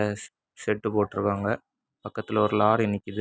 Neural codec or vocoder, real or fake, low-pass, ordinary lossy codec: none; real; none; none